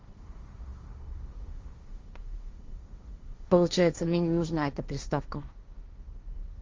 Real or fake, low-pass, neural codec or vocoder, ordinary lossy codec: fake; 7.2 kHz; codec, 16 kHz, 1.1 kbps, Voila-Tokenizer; Opus, 32 kbps